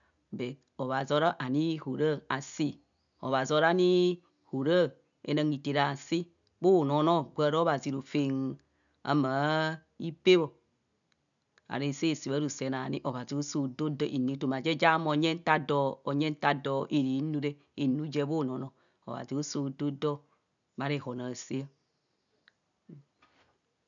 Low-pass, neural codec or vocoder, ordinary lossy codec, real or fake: 7.2 kHz; none; none; real